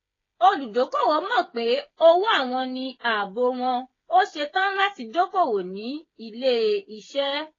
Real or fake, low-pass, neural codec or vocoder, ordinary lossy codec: fake; 7.2 kHz; codec, 16 kHz, 8 kbps, FreqCodec, smaller model; AAC, 32 kbps